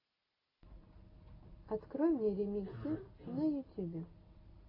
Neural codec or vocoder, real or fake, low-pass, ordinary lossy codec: none; real; 5.4 kHz; AAC, 24 kbps